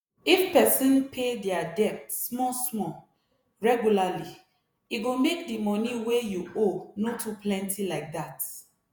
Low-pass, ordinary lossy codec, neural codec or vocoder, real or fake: none; none; vocoder, 48 kHz, 128 mel bands, Vocos; fake